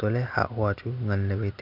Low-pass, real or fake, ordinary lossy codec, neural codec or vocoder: 5.4 kHz; real; none; none